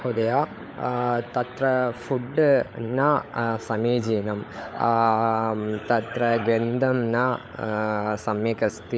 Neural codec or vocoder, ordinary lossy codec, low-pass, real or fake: codec, 16 kHz, 16 kbps, FunCodec, trained on LibriTTS, 50 frames a second; none; none; fake